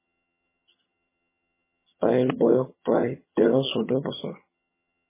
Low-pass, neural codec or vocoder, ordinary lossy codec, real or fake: 3.6 kHz; vocoder, 22.05 kHz, 80 mel bands, HiFi-GAN; MP3, 16 kbps; fake